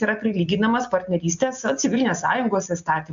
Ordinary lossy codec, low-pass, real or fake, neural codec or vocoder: AAC, 64 kbps; 7.2 kHz; real; none